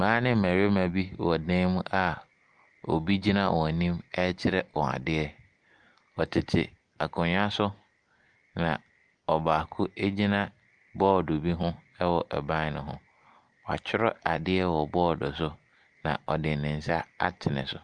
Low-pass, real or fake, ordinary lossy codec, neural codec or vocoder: 9.9 kHz; real; Opus, 32 kbps; none